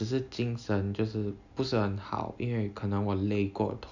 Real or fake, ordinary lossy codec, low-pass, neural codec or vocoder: real; none; 7.2 kHz; none